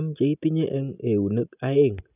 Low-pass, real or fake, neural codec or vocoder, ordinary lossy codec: 3.6 kHz; real; none; none